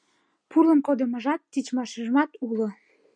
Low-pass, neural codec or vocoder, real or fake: 9.9 kHz; none; real